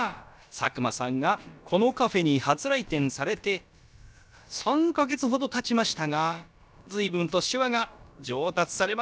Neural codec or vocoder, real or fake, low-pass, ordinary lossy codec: codec, 16 kHz, about 1 kbps, DyCAST, with the encoder's durations; fake; none; none